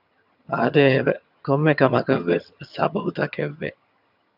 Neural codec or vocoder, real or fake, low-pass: vocoder, 22.05 kHz, 80 mel bands, HiFi-GAN; fake; 5.4 kHz